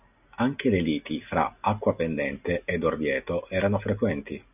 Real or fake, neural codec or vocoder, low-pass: real; none; 3.6 kHz